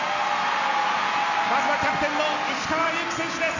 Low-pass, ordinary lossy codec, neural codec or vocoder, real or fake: 7.2 kHz; none; none; real